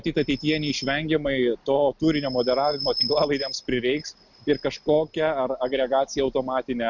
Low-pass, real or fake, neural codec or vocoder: 7.2 kHz; real; none